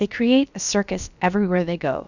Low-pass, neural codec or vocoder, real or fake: 7.2 kHz; codec, 16 kHz, about 1 kbps, DyCAST, with the encoder's durations; fake